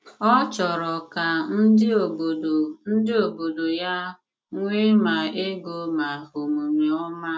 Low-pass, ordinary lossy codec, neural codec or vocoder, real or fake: none; none; none; real